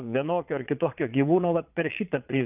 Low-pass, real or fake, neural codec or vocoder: 3.6 kHz; fake; vocoder, 22.05 kHz, 80 mel bands, Vocos